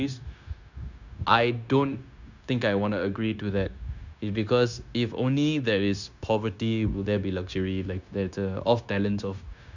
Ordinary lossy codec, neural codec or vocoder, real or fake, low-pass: none; codec, 16 kHz, 0.9 kbps, LongCat-Audio-Codec; fake; 7.2 kHz